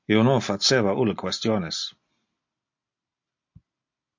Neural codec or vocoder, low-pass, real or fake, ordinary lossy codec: none; 7.2 kHz; real; MP3, 48 kbps